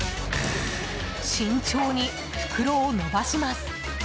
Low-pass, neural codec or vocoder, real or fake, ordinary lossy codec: none; none; real; none